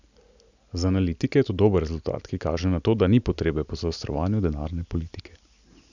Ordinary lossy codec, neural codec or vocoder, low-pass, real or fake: none; none; 7.2 kHz; real